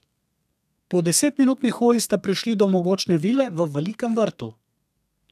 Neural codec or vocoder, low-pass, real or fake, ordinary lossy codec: codec, 32 kHz, 1.9 kbps, SNAC; 14.4 kHz; fake; none